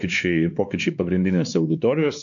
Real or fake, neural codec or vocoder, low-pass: fake; codec, 16 kHz, 2 kbps, X-Codec, WavLM features, trained on Multilingual LibriSpeech; 7.2 kHz